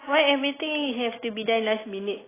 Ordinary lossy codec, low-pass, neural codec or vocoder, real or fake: AAC, 16 kbps; 3.6 kHz; none; real